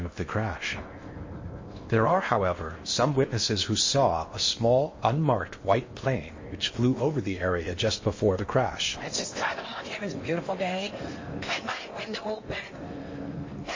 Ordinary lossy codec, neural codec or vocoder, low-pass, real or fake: MP3, 32 kbps; codec, 16 kHz in and 24 kHz out, 0.8 kbps, FocalCodec, streaming, 65536 codes; 7.2 kHz; fake